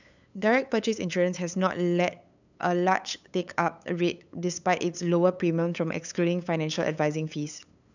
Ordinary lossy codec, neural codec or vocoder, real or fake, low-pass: none; codec, 16 kHz, 8 kbps, FunCodec, trained on LibriTTS, 25 frames a second; fake; 7.2 kHz